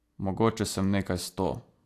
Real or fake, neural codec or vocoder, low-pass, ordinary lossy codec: real; none; 14.4 kHz; AAC, 64 kbps